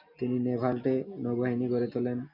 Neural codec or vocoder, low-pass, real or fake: none; 5.4 kHz; real